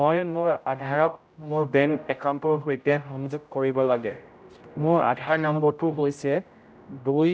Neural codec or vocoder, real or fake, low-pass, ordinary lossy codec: codec, 16 kHz, 0.5 kbps, X-Codec, HuBERT features, trained on general audio; fake; none; none